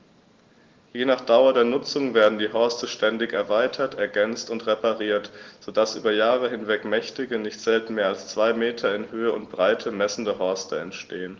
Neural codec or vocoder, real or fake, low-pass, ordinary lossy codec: none; real; 7.2 kHz; Opus, 16 kbps